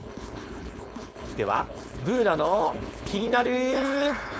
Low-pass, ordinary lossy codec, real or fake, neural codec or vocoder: none; none; fake; codec, 16 kHz, 4.8 kbps, FACodec